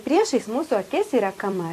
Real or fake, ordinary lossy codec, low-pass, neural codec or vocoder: fake; AAC, 64 kbps; 14.4 kHz; vocoder, 44.1 kHz, 128 mel bands every 256 samples, BigVGAN v2